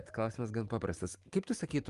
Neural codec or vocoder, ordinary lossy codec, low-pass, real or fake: none; Opus, 32 kbps; 10.8 kHz; real